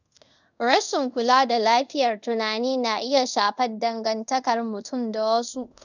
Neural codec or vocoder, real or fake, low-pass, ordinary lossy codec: codec, 24 kHz, 0.5 kbps, DualCodec; fake; 7.2 kHz; none